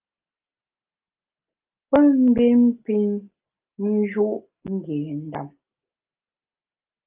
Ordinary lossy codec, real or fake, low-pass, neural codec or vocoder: Opus, 24 kbps; real; 3.6 kHz; none